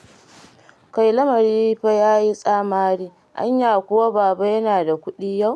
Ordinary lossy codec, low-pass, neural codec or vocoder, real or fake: none; none; none; real